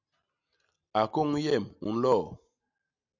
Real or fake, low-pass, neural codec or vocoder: real; 7.2 kHz; none